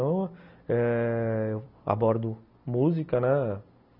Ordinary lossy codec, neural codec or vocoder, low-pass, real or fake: none; none; 5.4 kHz; real